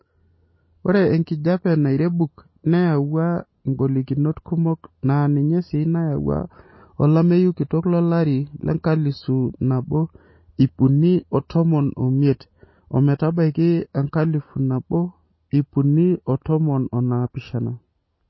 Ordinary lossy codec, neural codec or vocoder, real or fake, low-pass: MP3, 24 kbps; none; real; 7.2 kHz